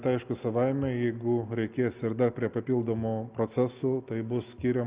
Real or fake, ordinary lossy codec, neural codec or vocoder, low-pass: real; Opus, 24 kbps; none; 3.6 kHz